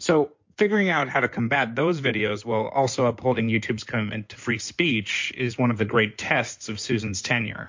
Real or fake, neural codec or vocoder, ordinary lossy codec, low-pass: fake; codec, 16 kHz in and 24 kHz out, 2.2 kbps, FireRedTTS-2 codec; MP3, 48 kbps; 7.2 kHz